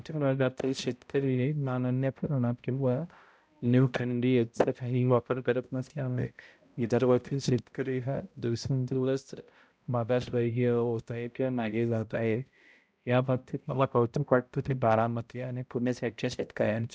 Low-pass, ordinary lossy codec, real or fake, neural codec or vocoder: none; none; fake; codec, 16 kHz, 0.5 kbps, X-Codec, HuBERT features, trained on balanced general audio